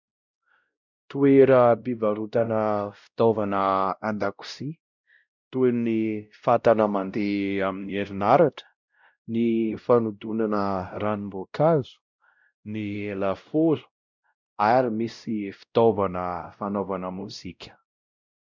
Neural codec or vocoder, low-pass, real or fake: codec, 16 kHz, 0.5 kbps, X-Codec, WavLM features, trained on Multilingual LibriSpeech; 7.2 kHz; fake